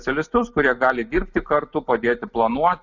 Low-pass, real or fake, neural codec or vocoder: 7.2 kHz; real; none